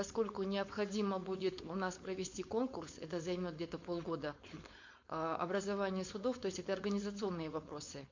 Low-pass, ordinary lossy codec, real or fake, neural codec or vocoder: 7.2 kHz; MP3, 48 kbps; fake; codec, 16 kHz, 4.8 kbps, FACodec